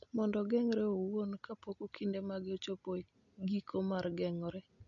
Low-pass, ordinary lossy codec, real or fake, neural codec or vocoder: 7.2 kHz; none; real; none